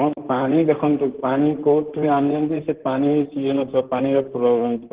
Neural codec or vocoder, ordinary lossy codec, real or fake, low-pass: vocoder, 44.1 kHz, 128 mel bands, Pupu-Vocoder; Opus, 16 kbps; fake; 3.6 kHz